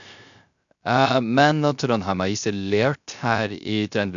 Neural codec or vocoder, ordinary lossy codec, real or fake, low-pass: codec, 16 kHz, 0.3 kbps, FocalCodec; none; fake; 7.2 kHz